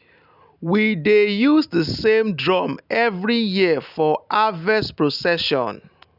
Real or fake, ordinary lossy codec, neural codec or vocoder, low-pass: real; none; none; 5.4 kHz